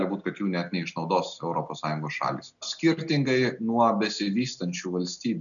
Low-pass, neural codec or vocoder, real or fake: 7.2 kHz; none; real